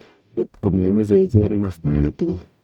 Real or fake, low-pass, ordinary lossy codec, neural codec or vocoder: fake; 19.8 kHz; none; codec, 44.1 kHz, 0.9 kbps, DAC